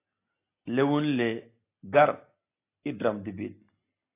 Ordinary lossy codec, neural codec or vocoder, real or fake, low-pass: AAC, 24 kbps; none; real; 3.6 kHz